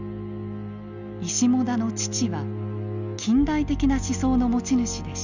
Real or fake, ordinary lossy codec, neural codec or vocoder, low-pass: real; none; none; 7.2 kHz